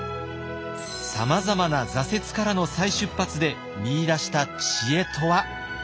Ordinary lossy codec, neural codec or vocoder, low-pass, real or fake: none; none; none; real